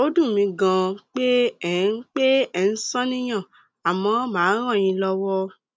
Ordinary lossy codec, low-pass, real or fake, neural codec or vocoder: none; none; real; none